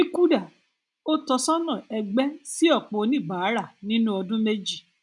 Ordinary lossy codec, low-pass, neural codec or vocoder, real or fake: none; 10.8 kHz; none; real